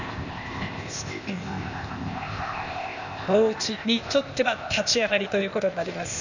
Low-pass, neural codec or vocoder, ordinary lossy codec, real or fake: 7.2 kHz; codec, 16 kHz, 0.8 kbps, ZipCodec; none; fake